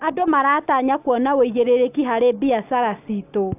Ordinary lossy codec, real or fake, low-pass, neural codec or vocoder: AAC, 32 kbps; real; 3.6 kHz; none